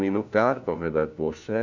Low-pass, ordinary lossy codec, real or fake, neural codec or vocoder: 7.2 kHz; none; fake; codec, 16 kHz, 1 kbps, FunCodec, trained on LibriTTS, 50 frames a second